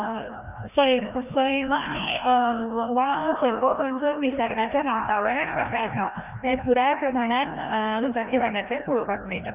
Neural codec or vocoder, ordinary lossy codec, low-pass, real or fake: codec, 16 kHz, 1 kbps, FreqCodec, larger model; none; 3.6 kHz; fake